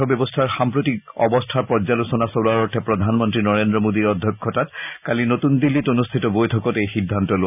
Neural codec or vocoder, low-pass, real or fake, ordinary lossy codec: none; 3.6 kHz; real; none